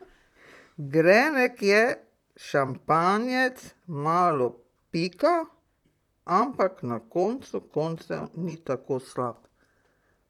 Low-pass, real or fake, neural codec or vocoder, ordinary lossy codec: 19.8 kHz; fake; vocoder, 44.1 kHz, 128 mel bands, Pupu-Vocoder; none